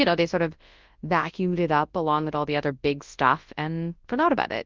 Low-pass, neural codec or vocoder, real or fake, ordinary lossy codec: 7.2 kHz; codec, 24 kHz, 0.9 kbps, WavTokenizer, large speech release; fake; Opus, 32 kbps